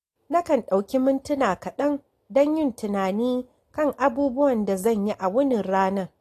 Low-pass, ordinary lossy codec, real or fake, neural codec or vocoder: 14.4 kHz; AAC, 48 kbps; real; none